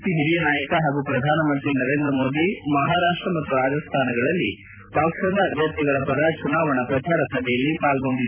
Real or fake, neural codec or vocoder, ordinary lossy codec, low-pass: fake; vocoder, 44.1 kHz, 128 mel bands every 256 samples, BigVGAN v2; none; 3.6 kHz